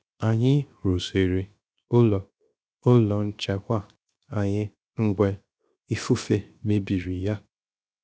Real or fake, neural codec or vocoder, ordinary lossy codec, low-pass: fake; codec, 16 kHz, 0.7 kbps, FocalCodec; none; none